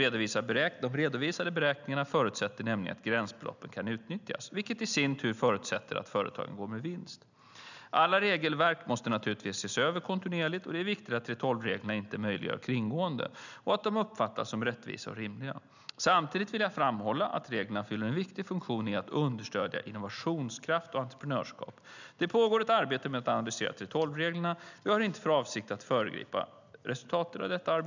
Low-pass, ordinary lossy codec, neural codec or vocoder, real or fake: 7.2 kHz; none; none; real